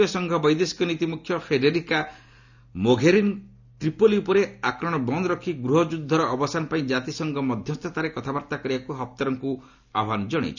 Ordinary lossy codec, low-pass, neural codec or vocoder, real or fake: none; 7.2 kHz; none; real